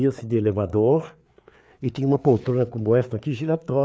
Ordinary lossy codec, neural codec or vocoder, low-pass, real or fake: none; codec, 16 kHz, 4 kbps, FreqCodec, larger model; none; fake